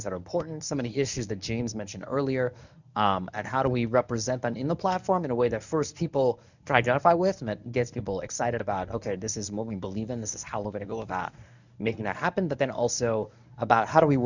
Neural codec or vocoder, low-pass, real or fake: codec, 24 kHz, 0.9 kbps, WavTokenizer, medium speech release version 1; 7.2 kHz; fake